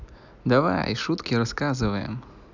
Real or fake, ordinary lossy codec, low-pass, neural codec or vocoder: real; none; 7.2 kHz; none